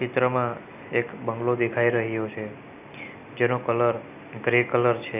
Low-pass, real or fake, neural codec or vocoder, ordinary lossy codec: 3.6 kHz; real; none; none